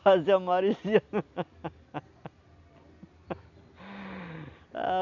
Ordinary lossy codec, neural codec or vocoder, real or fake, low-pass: none; none; real; 7.2 kHz